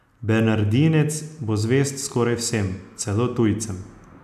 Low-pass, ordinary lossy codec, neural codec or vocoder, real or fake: 14.4 kHz; none; none; real